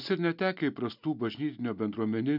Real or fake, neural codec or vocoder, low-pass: real; none; 5.4 kHz